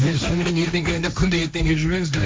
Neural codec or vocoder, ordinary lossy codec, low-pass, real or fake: codec, 16 kHz, 1.1 kbps, Voila-Tokenizer; none; none; fake